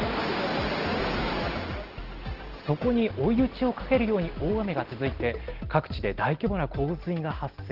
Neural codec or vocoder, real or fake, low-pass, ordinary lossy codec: none; real; 5.4 kHz; Opus, 16 kbps